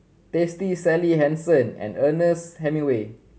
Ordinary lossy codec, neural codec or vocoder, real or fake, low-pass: none; none; real; none